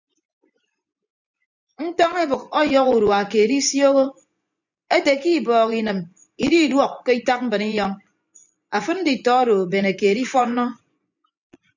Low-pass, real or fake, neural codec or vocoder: 7.2 kHz; fake; vocoder, 24 kHz, 100 mel bands, Vocos